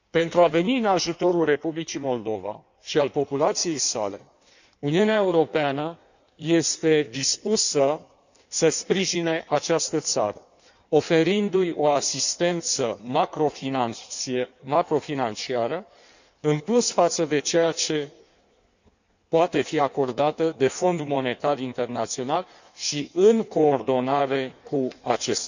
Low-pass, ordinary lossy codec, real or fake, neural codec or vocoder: 7.2 kHz; none; fake; codec, 16 kHz in and 24 kHz out, 1.1 kbps, FireRedTTS-2 codec